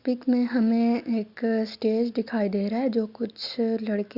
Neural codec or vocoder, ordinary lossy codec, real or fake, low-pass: none; Opus, 64 kbps; real; 5.4 kHz